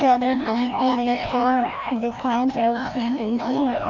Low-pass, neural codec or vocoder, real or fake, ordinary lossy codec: 7.2 kHz; codec, 16 kHz, 1 kbps, FreqCodec, larger model; fake; none